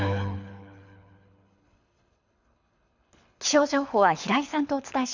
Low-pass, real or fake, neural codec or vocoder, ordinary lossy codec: 7.2 kHz; fake; codec, 24 kHz, 6 kbps, HILCodec; none